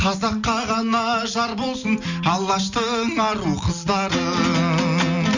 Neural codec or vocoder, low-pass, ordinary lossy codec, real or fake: none; 7.2 kHz; none; real